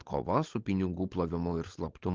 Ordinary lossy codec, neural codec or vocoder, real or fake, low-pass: Opus, 16 kbps; codec, 16 kHz, 16 kbps, FunCodec, trained on LibriTTS, 50 frames a second; fake; 7.2 kHz